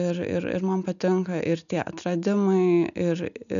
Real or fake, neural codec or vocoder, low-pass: real; none; 7.2 kHz